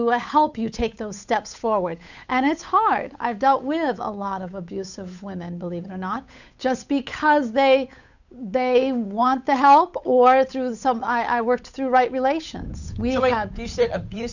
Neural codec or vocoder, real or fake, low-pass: codec, 16 kHz, 8 kbps, FunCodec, trained on Chinese and English, 25 frames a second; fake; 7.2 kHz